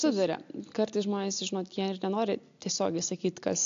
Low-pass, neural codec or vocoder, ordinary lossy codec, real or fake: 7.2 kHz; none; AAC, 64 kbps; real